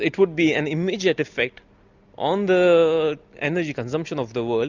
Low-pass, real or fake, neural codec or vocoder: 7.2 kHz; real; none